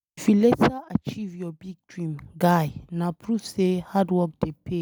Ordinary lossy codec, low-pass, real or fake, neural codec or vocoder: none; none; real; none